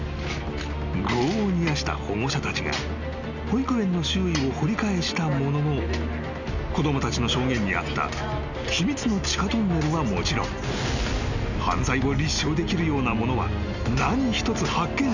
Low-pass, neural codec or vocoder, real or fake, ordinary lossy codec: 7.2 kHz; none; real; none